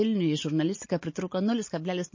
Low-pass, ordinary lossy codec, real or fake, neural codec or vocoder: 7.2 kHz; MP3, 32 kbps; real; none